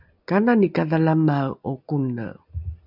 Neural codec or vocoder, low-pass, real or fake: none; 5.4 kHz; real